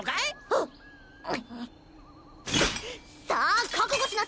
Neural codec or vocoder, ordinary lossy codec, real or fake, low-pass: none; none; real; none